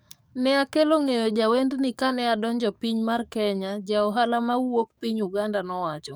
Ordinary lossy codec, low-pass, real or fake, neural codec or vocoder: none; none; fake; codec, 44.1 kHz, 7.8 kbps, DAC